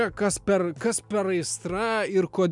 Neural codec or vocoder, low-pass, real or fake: none; 10.8 kHz; real